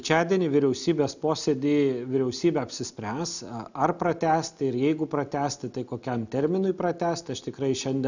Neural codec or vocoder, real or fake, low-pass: none; real; 7.2 kHz